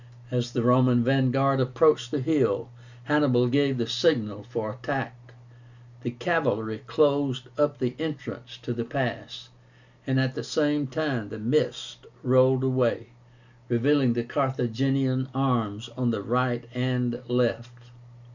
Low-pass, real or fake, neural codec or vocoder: 7.2 kHz; real; none